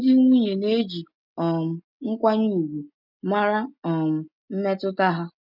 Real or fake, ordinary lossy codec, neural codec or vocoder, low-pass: real; none; none; 5.4 kHz